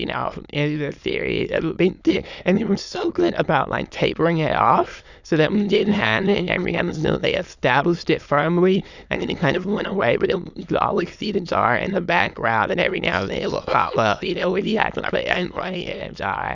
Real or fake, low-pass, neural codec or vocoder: fake; 7.2 kHz; autoencoder, 22.05 kHz, a latent of 192 numbers a frame, VITS, trained on many speakers